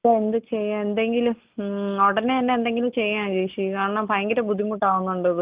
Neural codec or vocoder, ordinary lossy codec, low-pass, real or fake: none; Opus, 24 kbps; 3.6 kHz; real